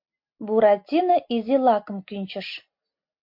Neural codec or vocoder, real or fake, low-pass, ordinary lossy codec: none; real; 5.4 kHz; MP3, 48 kbps